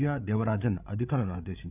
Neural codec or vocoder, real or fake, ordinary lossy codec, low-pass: codec, 16 kHz, 8 kbps, FreqCodec, larger model; fake; none; 3.6 kHz